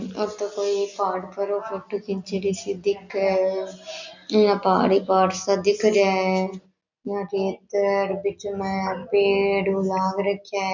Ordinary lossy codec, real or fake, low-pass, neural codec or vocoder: none; real; 7.2 kHz; none